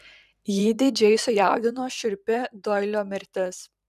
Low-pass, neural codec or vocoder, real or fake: 14.4 kHz; vocoder, 44.1 kHz, 128 mel bands every 512 samples, BigVGAN v2; fake